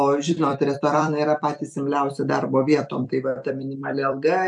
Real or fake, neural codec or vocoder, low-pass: fake; vocoder, 44.1 kHz, 128 mel bands every 256 samples, BigVGAN v2; 10.8 kHz